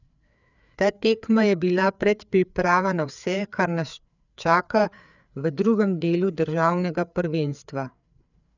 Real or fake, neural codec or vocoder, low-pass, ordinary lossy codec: fake; codec, 16 kHz, 4 kbps, FreqCodec, larger model; 7.2 kHz; none